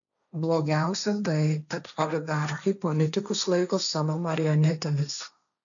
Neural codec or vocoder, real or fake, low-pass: codec, 16 kHz, 1.1 kbps, Voila-Tokenizer; fake; 7.2 kHz